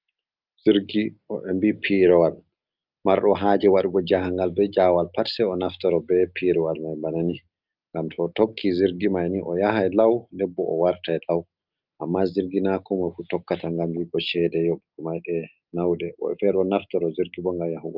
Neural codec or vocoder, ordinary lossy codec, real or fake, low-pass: none; Opus, 24 kbps; real; 5.4 kHz